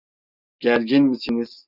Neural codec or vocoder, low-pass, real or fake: none; 5.4 kHz; real